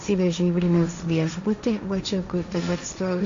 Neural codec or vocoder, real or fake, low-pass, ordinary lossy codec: codec, 16 kHz, 1.1 kbps, Voila-Tokenizer; fake; 7.2 kHz; MP3, 64 kbps